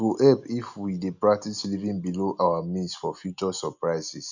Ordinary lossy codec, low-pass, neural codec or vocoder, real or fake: none; 7.2 kHz; none; real